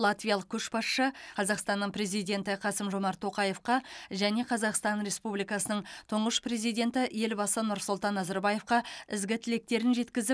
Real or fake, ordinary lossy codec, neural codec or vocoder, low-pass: real; none; none; none